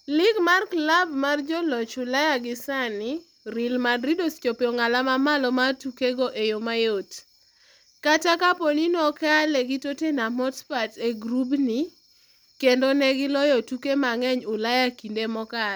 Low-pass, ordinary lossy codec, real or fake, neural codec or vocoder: none; none; real; none